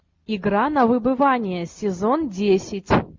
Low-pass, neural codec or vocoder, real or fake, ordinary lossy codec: 7.2 kHz; none; real; MP3, 48 kbps